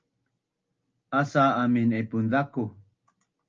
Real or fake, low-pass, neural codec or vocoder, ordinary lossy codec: real; 7.2 kHz; none; Opus, 24 kbps